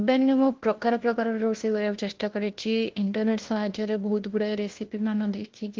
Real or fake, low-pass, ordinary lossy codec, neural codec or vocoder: fake; 7.2 kHz; Opus, 16 kbps; codec, 16 kHz, 1 kbps, FunCodec, trained on LibriTTS, 50 frames a second